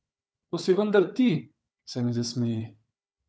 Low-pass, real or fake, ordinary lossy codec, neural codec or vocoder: none; fake; none; codec, 16 kHz, 4 kbps, FunCodec, trained on Chinese and English, 50 frames a second